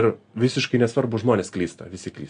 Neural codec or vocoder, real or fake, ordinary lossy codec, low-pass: none; real; AAC, 96 kbps; 9.9 kHz